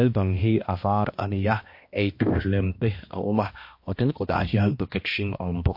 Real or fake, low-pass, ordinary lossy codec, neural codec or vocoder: fake; 5.4 kHz; MP3, 32 kbps; codec, 16 kHz, 1 kbps, X-Codec, HuBERT features, trained on balanced general audio